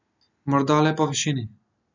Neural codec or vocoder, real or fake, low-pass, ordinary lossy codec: none; real; 7.2 kHz; none